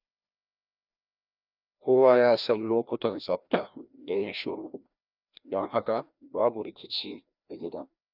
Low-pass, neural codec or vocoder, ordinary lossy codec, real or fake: 5.4 kHz; codec, 16 kHz, 1 kbps, FreqCodec, larger model; none; fake